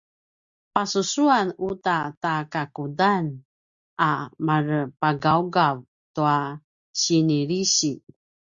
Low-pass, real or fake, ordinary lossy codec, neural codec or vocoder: 7.2 kHz; real; Opus, 64 kbps; none